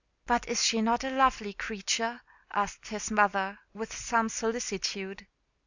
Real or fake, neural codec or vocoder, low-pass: real; none; 7.2 kHz